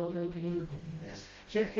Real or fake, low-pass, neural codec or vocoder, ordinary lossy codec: fake; 7.2 kHz; codec, 16 kHz, 0.5 kbps, FreqCodec, smaller model; Opus, 32 kbps